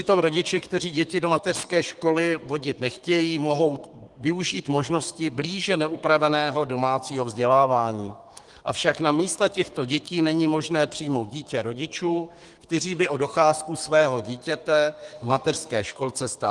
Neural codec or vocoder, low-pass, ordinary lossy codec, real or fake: codec, 32 kHz, 1.9 kbps, SNAC; 10.8 kHz; Opus, 24 kbps; fake